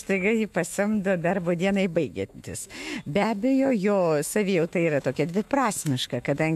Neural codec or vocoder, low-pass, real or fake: autoencoder, 48 kHz, 128 numbers a frame, DAC-VAE, trained on Japanese speech; 14.4 kHz; fake